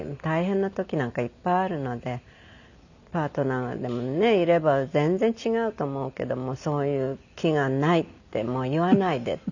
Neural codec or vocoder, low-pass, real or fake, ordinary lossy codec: none; 7.2 kHz; real; none